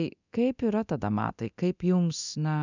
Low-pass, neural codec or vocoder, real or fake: 7.2 kHz; codec, 24 kHz, 0.9 kbps, DualCodec; fake